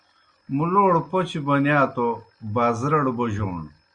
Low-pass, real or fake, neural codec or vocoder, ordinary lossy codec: 9.9 kHz; real; none; Opus, 64 kbps